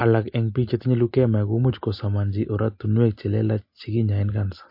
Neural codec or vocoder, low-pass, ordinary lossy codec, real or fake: none; 5.4 kHz; MP3, 32 kbps; real